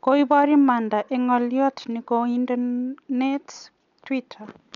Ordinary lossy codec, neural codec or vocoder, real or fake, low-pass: none; none; real; 7.2 kHz